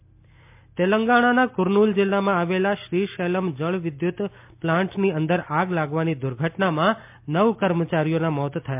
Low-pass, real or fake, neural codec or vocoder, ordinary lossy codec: 3.6 kHz; real; none; MP3, 32 kbps